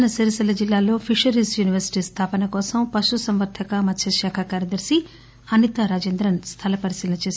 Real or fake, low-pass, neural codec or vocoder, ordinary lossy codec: real; none; none; none